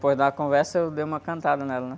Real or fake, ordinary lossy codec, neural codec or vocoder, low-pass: real; none; none; none